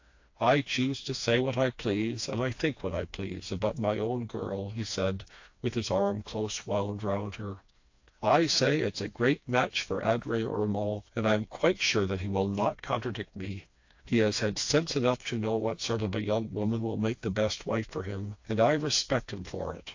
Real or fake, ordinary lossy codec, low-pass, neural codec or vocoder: fake; AAC, 48 kbps; 7.2 kHz; codec, 16 kHz, 2 kbps, FreqCodec, smaller model